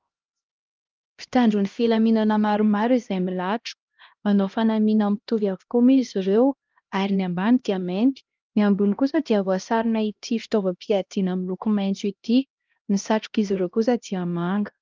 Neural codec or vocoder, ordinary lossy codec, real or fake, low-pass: codec, 16 kHz, 1 kbps, X-Codec, HuBERT features, trained on LibriSpeech; Opus, 32 kbps; fake; 7.2 kHz